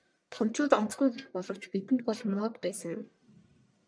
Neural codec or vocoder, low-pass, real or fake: codec, 44.1 kHz, 1.7 kbps, Pupu-Codec; 9.9 kHz; fake